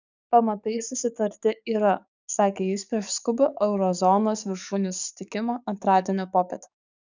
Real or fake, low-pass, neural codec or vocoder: fake; 7.2 kHz; codec, 44.1 kHz, 7.8 kbps, DAC